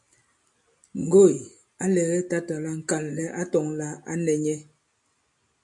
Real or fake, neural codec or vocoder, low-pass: real; none; 10.8 kHz